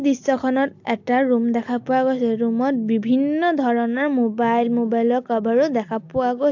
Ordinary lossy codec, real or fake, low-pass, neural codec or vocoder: AAC, 48 kbps; real; 7.2 kHz; none